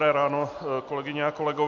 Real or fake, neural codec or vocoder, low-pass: real; none; 7.2 kHz